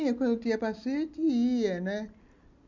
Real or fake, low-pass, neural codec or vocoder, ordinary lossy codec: real; 7.2 kHz; none; none